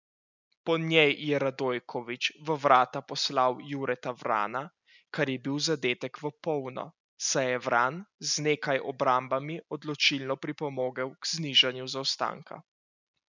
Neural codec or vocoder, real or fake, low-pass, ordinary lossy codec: none; real; 7.2 kHz; none